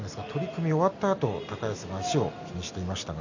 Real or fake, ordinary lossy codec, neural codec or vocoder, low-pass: real; none; none; 7.2 kHz